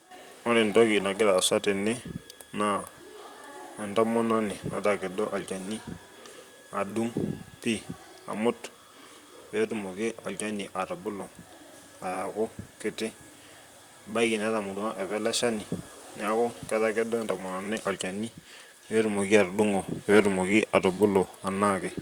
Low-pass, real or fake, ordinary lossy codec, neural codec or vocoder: 19.8 kHz; fake; Opus, 64 kbps; vocoder, 44.1 kHz, 128 mel bands, Pupu-Vocoder